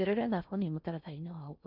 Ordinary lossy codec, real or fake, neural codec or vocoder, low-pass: none; fake; codec, 16 kHz in and 24 kHz out, 0.6 kbps, FocalCodec, streaming, 4096 codes; 5.4 kHz